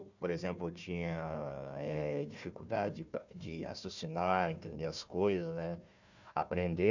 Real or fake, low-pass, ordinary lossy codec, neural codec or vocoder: fake; 7.2 kHz; none; codec, 16 kHz, 1 kbps, FunCodec, trained on Chinese and English, 50 frames a second